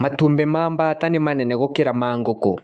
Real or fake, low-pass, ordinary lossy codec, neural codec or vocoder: fake; 9.9 kHz; Opus, 64 kbps; codec, 24 kHz, 3.1 kbps, DualCodec